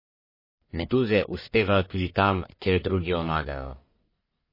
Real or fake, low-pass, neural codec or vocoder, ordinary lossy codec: fake; 5.4 kHz; codec, 44.1 kHz, 1.7 kbps, Pupu-Codec; MP3, 24 kbps